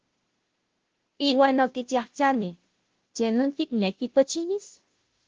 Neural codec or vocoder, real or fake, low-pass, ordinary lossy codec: codec, 16 kHz, 0.5 kbps, FunCodec, trained on Chinese and English, 25 frames a second; fake; 7.2 kHz; Opus, 16 kbps